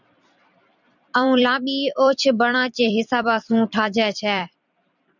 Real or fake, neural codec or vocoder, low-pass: fake; vocoder, 44.1 kHz, 128 mel bands every 256 samples, BigVGAN v2; 7.2 kHz